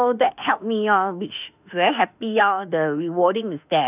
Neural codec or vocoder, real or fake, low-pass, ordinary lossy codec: autoencoder, 48 kHz, 32 numbers a frame, DAC-VAE, trained on Japanese speech; fake; 3.6 kHz; none